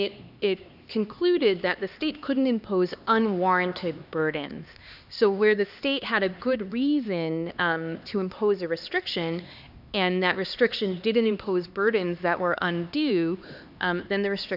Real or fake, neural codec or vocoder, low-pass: fake; codec, 16 kHz, 2 kbps, X-Codec, HuBERT features, trained on LibriSpeech; 5.4 kHz